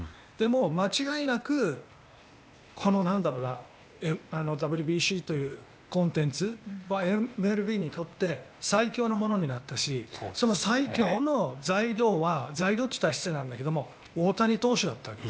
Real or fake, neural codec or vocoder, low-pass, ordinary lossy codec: fake; codec, 16 kHz, 0.8 kbps, ZipCodec; none; none